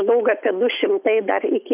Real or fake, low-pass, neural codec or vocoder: real; 3.6 kHz; none